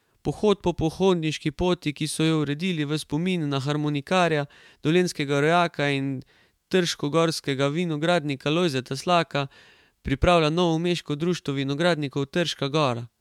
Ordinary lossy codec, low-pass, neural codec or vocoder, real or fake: MP3, 96 kbps; 19.8 kHz; autoencoder, 48 kHz, 128 numbers a frame, DAC-VAE, trained on Japanese speech; fake